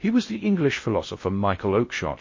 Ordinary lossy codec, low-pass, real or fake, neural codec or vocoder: MP3, 32 kbps; 7.2 kHz; fake; codec, 16 kHz in and 24 kHz out, 0.6 kbps, FocalCodec, streaming, 2048 codes